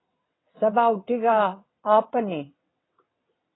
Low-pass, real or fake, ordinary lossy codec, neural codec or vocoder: 7.2 kHz; fake; AAC, 16 kbps; vocoder, 22.05 kHz, 80 mel bands, WaveNeXt